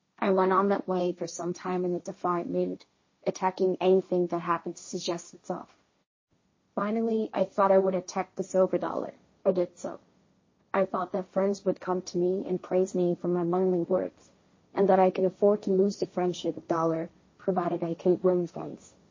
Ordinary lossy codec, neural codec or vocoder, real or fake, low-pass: MP3, 32 kbps; codec, 16 kHz, 1.1 kbps, Voila-Tokenizer; fake; 7.2 kHz